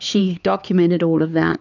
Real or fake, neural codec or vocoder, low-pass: fake; codec, 16 kHz, 4 kbps, X-Codec, HuBERT features, trained on LibriSpeech; 7.2 kHz